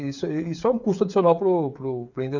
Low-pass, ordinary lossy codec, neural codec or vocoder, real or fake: 7.2 kHz; none; codec, 16 kHz, 8 kbps, FreqCodec, smaller model; fake